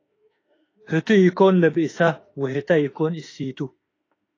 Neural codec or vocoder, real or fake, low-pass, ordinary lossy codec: autoencoder, 48 kHz, 32 numbers a frame, DAC-VAE, trained on Japanese speech; fake; 7.2 kHz; AAC, 32 kbps